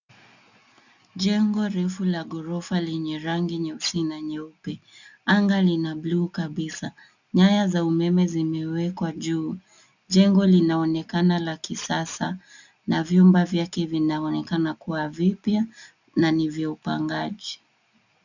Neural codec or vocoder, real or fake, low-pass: none; real; 7.2 kHz